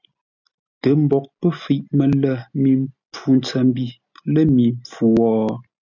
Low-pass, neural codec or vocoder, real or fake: 7.2 kHz; none; real